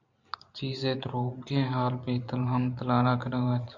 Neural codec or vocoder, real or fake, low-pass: vocoder, 44.1 kHz, 128 mel bands every 512 samples, BigVGAN v2; fake; 7.2 kHz